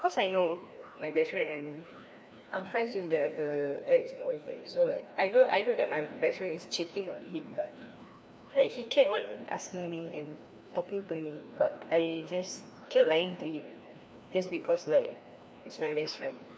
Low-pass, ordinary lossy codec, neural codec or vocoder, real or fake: none; none; codec, 16 kHz, 1 kbps, FreqCodec, larger model; fake